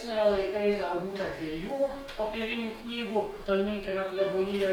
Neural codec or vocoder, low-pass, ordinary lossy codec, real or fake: codec, 44.1 kHz, 2.6 kbps, DAC; 19.8 kHz; MP3, 96 kbps; fake